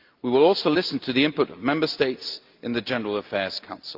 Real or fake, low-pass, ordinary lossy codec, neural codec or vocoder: real; 5.4 kHz; Opus, 24 kbps; none